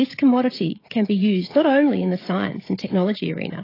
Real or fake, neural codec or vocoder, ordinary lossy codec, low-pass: fake; codec, 16 kHz, 16 kbps, FreqCodec, smaller model; AAC, 24 kbps; 5.4 kHz